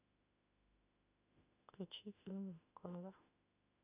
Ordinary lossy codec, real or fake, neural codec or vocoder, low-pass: none; fake; autoencoder, 48 kHz, 32 numbers a frame, DAC-VAE, trained on Japanese speech; 3.6 kHz